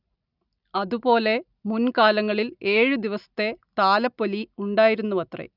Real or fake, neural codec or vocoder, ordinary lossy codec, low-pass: real; none; none; 5.4 kHz